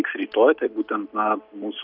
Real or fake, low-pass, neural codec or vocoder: real; 5.4 kHz; none